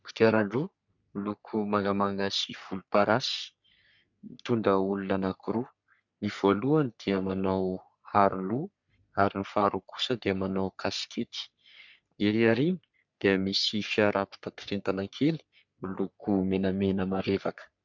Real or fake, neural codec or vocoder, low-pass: fake; codec, 44.1 kHz, 3.4 kbps, Pupu-Codec; 7.2 kHz